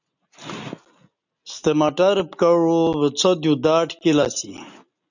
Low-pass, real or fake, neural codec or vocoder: 7.2 kHz; real; none